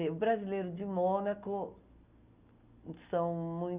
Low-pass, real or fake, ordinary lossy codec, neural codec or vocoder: 3.6 kHz; real; Opus, 64 kbps; none